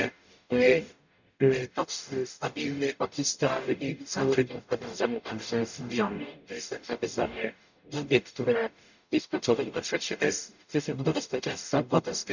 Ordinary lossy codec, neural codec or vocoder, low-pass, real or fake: none; codec, 44.1 kHz, 0.9 kbps, DAC; 7.2 kHz; fake